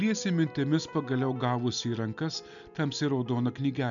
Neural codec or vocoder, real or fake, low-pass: none; real; 7.2 kHz